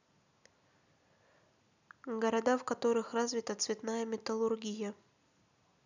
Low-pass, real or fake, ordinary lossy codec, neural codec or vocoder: 7.2 kHz; real; none; none